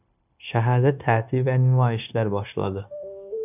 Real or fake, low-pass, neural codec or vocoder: fake; 3.6 kHz; codec, 16 kHz, 0.9 kbps, LongCat-Audio-Codec